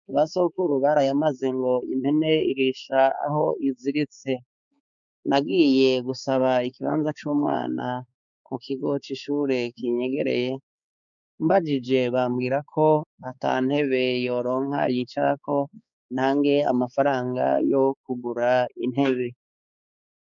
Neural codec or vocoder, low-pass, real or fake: codec, 16 kHz, 4 kbps, X-Codec, HuBERT features, trained on balanced general audio; 7.2 kHz; fake